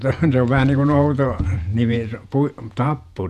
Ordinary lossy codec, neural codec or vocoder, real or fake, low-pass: none; vocoder, 48 kHz, 128 mel bands, Vocos; fake; 14.4 kHz